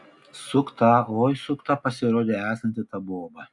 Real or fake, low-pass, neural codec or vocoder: real; 10.8 kHz; none